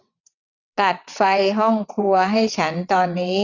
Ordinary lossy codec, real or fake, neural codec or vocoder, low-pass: none; fake; vocoder, 22.05 kHz, 80 mel bands, WaveNeXt; 7.2 kHz